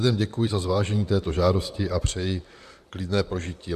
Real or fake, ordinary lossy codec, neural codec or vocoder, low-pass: fake; AAC, 96 kbps; vocoder, 44.1 kHz, 128 mel bands, Pupu-Vocoder; 14.4 kHz